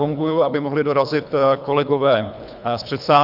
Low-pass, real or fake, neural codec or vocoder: 5.4 kHz; fake; codec, 24 kHz, 3 kbps, HILCodec